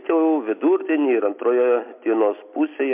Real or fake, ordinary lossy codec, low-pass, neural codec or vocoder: real; MP3, 32 kbps; 3.6 kHz; none